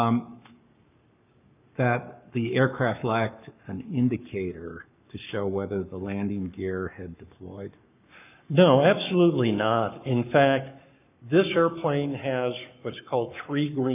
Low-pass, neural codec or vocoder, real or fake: 3.6 kHz; codec, 44.1 kHz, 7.8 kbps, Pupu-Codec; fake